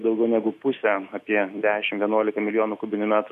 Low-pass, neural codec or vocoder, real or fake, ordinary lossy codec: 14.4 kHz; autoencoder, 48 kHz, 128 numbers a frame, DAC-VAE, trained on Japanese speech; fake; MP3, 64 kbps